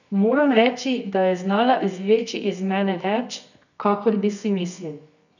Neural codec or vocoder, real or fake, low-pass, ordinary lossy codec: codec, 24 kHz, 0.9 kbps, WavTokenizer, medium music audio release; fake; 7.2 kHz; none